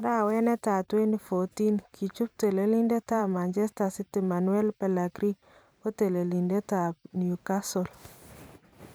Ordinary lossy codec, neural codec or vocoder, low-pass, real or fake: none; vocoder, 44.1 kHz, 128 mel bands every 512 samples, BigVGAN v2; none; fake